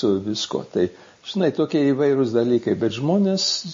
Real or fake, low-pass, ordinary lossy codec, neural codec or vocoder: real; 7.2 kHz; MP3, 32 kbps; none